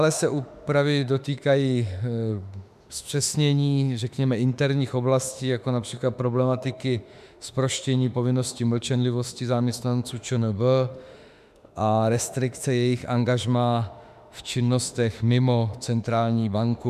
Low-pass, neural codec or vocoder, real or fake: 14.4 kHz; autoencoder, 48 kHz, 32 numbers a frame, DAC-VAE, trained on Japanese speech; fake